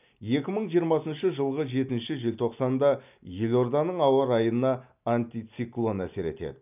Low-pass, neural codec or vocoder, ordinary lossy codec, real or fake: 3.6 kHz; none; none; real